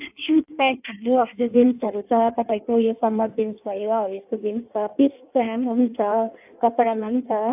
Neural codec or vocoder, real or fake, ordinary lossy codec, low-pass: codec, 16 kHz in and 24 kHz out, 1.1 kbps, FireRedTTS-2 codec; fake; AAC, 32 kbps; 3.6 kHz